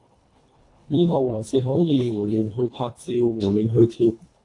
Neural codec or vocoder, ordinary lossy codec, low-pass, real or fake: codec, 24 kHz, 1.5 kbps, HILCodec; AAC, 48 kbps; 10.8 kHz; fake